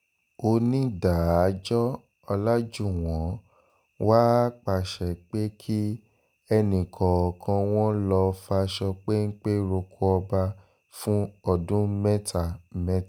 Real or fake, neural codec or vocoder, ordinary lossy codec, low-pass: real; none; none; none